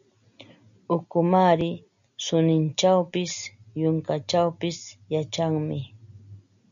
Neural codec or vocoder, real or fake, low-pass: none; real; 7.2 kHz